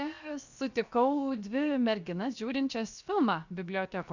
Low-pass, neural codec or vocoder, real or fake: 7.2 kHz; codec, 16 kHz, 0.7 kbps, FocalCodec; fake